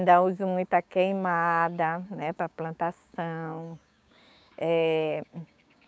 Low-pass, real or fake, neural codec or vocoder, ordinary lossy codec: none; fake; codec, 16 kHz, 6 kbps, DAC; none